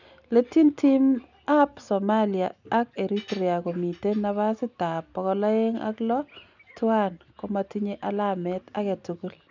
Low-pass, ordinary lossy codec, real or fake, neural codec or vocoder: 7.2 kHz; none; real; none